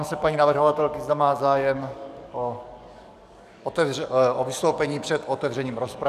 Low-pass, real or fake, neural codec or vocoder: 14.4 kHz; fake; codec, 44.1 kHz, 7.8 kbps, DAC